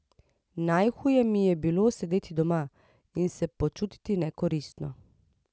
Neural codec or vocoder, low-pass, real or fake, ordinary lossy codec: none; none; real; none